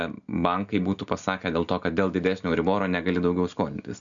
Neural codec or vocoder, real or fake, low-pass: none; real; 7.2 kHz